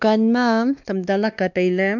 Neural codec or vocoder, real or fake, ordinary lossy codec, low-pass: codec, 16 kHz, 2 kbps, X-Codec, WavLM features, trained on Multilingual LibriSpeech; fake; none; 7.2 kHz